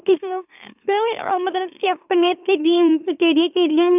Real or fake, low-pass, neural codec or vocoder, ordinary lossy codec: fake; 3.6 kHz; autoencoder, 44.1 kHz, a latent of 192 numbers a frame, MeloTTS; none